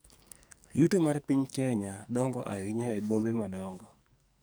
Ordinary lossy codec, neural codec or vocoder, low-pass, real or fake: none; codec, 44.1 kHz, 2.6 kbps, SNAC; none; fake